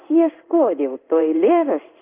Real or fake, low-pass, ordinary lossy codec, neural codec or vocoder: fake; 3.6 kHz; Opus, 24 kbps; codec, 16 kHz in and 24 kHz out, 1 kbps, XY-Tokenizer